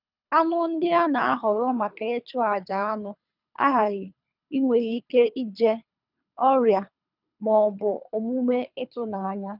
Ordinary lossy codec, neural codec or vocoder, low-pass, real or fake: none; codec, 24 kHz, 3 kbps, HILCodec; 5.4 kHz; fake